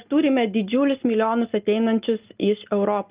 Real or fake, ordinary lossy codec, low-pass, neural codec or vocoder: real; Opus, 32 kbps; 3.6 kHz; none